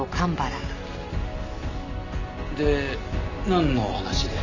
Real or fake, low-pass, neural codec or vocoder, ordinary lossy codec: real; 7.2 kHz; none; none